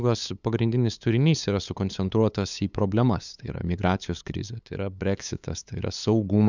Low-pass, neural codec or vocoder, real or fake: 7.2 kHz; codec, 16 kHz, 8 kbps, FunCodec, trained on LibriTTS, 25 frames a second; fake